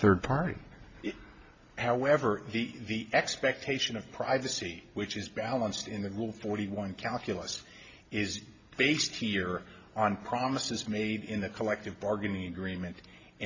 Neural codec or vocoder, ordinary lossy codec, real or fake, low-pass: none; MP3, 48 kbps; real; 7.2 kHz